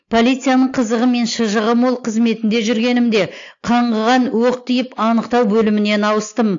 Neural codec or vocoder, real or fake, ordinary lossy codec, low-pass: none; real; AAC, 48 kbps; 7.2 kHz